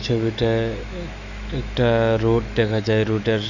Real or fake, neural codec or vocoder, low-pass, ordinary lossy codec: fake; autoencoder, 48 kHz, 128 numbers a frame, DAC-VAE, trained on Japanese speech; 7.2 kHz; none